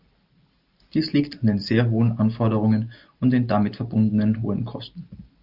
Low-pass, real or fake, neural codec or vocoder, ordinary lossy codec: 5.4 kHz; real; none; Opus, 32 kbps